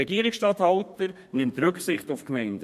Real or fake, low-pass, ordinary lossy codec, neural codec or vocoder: fake; 14.4 kHz; MP3, 64 kbps; codec, 32 kHz, 1.9 kbps, SNAC